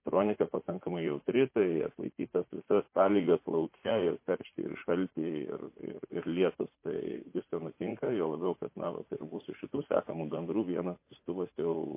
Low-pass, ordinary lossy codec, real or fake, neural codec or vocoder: 3.6 kHz; MP3, 24 kbps; fake; vocoder, 44.1 kHz, 80 mel bands, Vocos